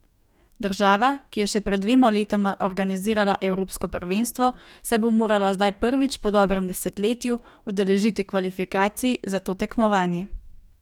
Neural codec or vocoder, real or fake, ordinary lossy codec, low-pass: codec, 44.1 kHz, 2.6 kbps, DAC; fake; none; 19.8 kHz